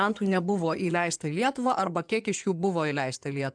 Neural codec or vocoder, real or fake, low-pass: codec, 16 kHz in and 24 kHz out, 2.2 kbps, FireRedTTS-2 codec; fake; 9.9 kHz